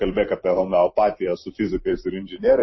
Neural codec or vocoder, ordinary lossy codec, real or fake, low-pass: vocoder, 44.1 kHz, 128 mel bands every 256 samples, BigVGAN v2; MP3, 24 kbps; fake; 7.2 kHz